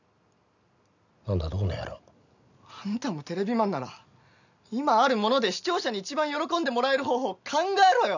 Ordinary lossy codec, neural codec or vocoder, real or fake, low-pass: none; none; real; 7.2 kHz